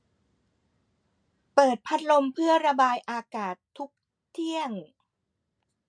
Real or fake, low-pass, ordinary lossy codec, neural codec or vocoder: real; 9.9 kHz; MP3, 64 kbps; none